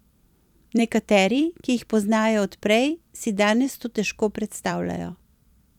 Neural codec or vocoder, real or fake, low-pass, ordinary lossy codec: vocoder, 44.1 kHz, 128 mel bands every 256 samples, BigVGAN v2; fake; 19.8 kHz; none